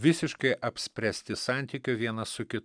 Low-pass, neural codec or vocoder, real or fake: 9.9 kHz; none; real